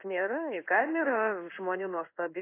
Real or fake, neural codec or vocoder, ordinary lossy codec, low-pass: fake; codec, 16 kHz in and 24 kHz out, 1 kbps, XY-Tokenizer; AAC, 24 kbps; 3.6 kHz